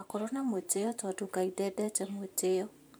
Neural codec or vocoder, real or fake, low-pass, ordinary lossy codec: vocoder, 44.1 kHz, 128 mel bands every 512 samples, BigVGAN v2; fake; none; none